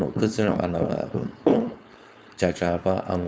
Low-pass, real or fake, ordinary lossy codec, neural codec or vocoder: none; fake; none; codec, 16 kHz, 4.8 kbps, FACodec